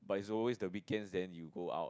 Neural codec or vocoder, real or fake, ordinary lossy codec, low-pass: none; real; none; none